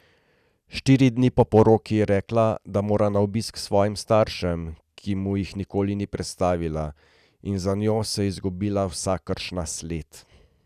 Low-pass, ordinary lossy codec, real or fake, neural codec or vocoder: 14.4 kHz; none; real; none